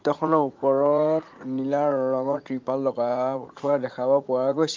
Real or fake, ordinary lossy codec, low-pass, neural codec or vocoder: fake; Opus, 24 kbps; 7.2 kHz; vocoder, 44.1 kHz, 80 mel bands, Vocos